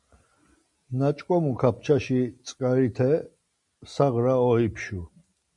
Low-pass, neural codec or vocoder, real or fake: 10.8 kHz; none; real